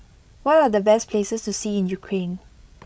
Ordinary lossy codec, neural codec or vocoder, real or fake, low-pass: none; codec, 16 kHz, 16 kbps, FreqCodec, larger model; fake; none